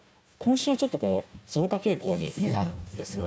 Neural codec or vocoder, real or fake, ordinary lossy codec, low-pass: codec, 16 kHz, 1 kbps, FunCodec, trained on Chinese and English, 50 frames a second; fake; none; none